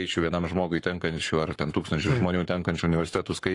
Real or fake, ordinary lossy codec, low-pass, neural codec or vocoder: fake; AAC, 48 kbps; 10.8 kHz; codec, 44.1 kHz, 7.8 kbps, Pupu-Codec